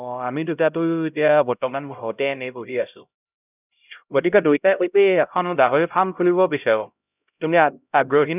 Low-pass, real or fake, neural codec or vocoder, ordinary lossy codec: 3.6 kHz; fake; codec, 16 kHz, 0.5 kbps, X-Codec, HuBERT features, trained on LibriSpeech; none